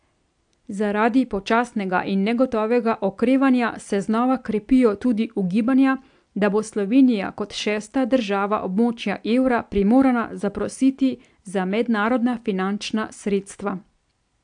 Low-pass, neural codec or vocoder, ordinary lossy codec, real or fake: 9.9 kHz; none; AAC, 64 kbps; real